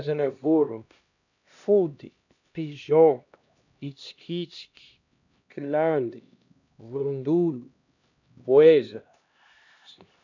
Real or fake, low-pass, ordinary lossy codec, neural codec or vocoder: fake; 7.2 kHz; none; codec, 16 kHz, 1 kbps, X-Codec, HuBERT features, trained on LibriSpeech